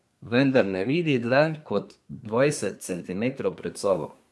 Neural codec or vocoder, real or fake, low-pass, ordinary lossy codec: codec, 24 kHz, 1 kbps, SNAC; fake; none; none